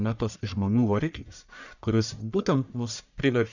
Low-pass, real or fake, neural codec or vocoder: 7.2 kHz; fake; codec, 44.1 kHz, 1.7 kbps, Pupu-Codec